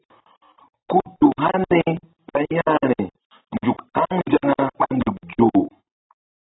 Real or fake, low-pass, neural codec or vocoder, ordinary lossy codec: real; 7.2 kHz; none; AAC, 16 kbps